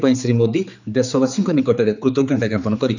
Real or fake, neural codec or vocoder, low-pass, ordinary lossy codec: fake; codec, 16 kHz, 4 kbps, X-Codec, HuBERT features, trained on general audio; 7.2 kHz; none